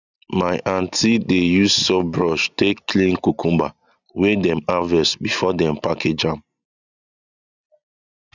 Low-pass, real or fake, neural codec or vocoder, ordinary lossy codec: 7.2 kHz; real; none; none